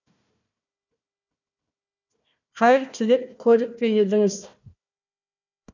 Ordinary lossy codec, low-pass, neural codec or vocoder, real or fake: none; 7.2 kHz; codec, 16 kHz, 1 kbps, FunCodec, trained on Chinese and English, 50 frames a second; fake